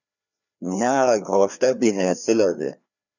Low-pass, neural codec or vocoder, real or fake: 7.2 kHz; codec, 16 kHz, 2 kbps, FreqCodec, larger model; fake